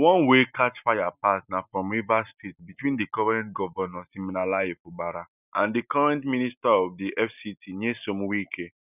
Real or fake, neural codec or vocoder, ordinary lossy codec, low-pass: real; none; none; 3.6 kHz